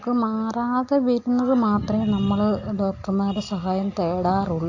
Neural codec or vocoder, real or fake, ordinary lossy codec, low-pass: none; real; AAC, 48 kbps; 7.2 kHz